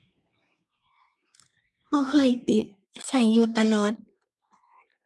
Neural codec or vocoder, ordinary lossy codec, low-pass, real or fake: codec, 24 kHz, 1 kbps, SNAC; none; none; fake